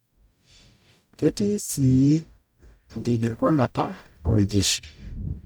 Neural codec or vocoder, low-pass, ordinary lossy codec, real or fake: codec, 44.1 kHz, 0.9 kbps, DAC; none; none; fake